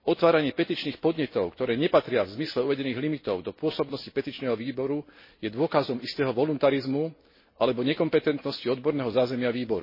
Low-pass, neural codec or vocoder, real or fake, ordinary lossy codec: 5.4 kHz; none; real; MP3, 24 kbps